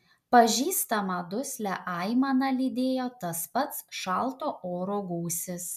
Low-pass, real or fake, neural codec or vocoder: 14.4 kHz; real; none